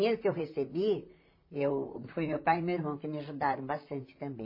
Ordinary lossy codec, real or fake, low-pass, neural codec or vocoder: MP3, 24 kbps; fake; 5.4 kHz; vocoder, 44.1 kHz, 128 mel bands, Pupu-Vocoder